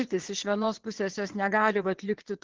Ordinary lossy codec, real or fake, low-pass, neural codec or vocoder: Opus, 16 kbps; fake; 7.2 kHz; vocoder, 22.05 kHz, 80 mel bands, WaveNeXt